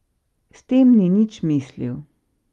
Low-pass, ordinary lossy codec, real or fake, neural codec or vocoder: 19.8 kHz; Opus, 32 kbps; real; none